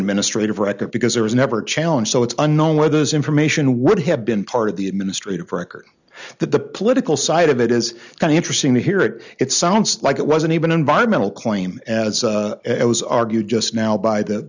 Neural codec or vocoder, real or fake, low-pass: none; real; 7.2 kHz